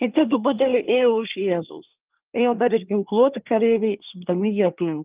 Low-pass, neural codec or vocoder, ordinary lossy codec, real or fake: 3.6 kHz; codec, 24 kHz, 1 kbps, SNAC; Opus, 32 kbps; fake